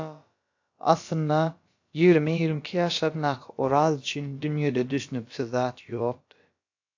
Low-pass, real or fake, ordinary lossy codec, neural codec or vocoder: 7.2 kHz; fake; AAC, 48 kbps; codec, 16 kHz, about 1 kbps, DyCAST, with the encoder's durations